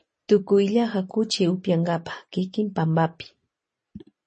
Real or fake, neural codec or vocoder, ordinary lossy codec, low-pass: real; none; MP3, 32 kbps; 10.8 kHz